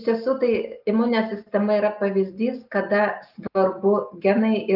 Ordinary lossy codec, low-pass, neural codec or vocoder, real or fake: Opus, 32 kbps; 5.4 kHz; none; real